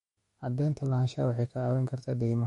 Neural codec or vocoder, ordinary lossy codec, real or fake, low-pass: autoencoder, 48 kHz, 32 numbers a frame, DAC-VAE, trained on Japanese speech; MP3, 48 kbps; fake; 19.8 kHz